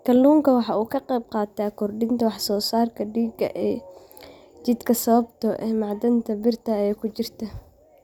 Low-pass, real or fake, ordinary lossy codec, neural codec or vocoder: 19.8 kHz; real; none; none